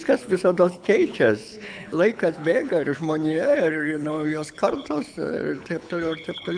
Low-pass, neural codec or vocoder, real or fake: 9.9 kHz; codec, 24 kHz, 6 kbps, HILCodec; fake